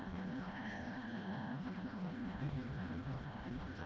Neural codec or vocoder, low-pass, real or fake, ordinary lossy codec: codec, 16 kHz, 1 kbps, FreqCodec, smaller model; none; fake; none